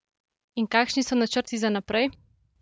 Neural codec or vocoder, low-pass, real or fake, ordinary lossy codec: none; none; real; none